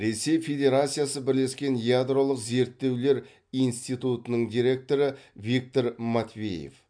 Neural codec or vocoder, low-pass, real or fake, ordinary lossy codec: none; 9.9 kHz; real; MP3, 64 kbps